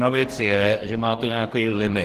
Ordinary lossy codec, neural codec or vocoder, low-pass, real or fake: Opus, 16 kbps; codec, 44.1 kHz, 2.6 kbps, DAC; 14.4 kHz; fake